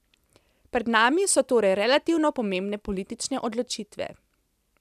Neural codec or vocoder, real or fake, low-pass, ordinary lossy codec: none; real; 14.4 kHz; none